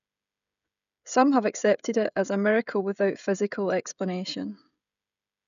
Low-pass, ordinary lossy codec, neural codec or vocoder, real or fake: 7.2 kHz; none; codec, 16 kHz, 16 kbps, FreqCodec, smaller model; fake